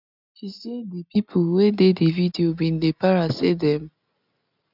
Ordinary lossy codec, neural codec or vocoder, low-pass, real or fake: none; none; 5.4 kHz; real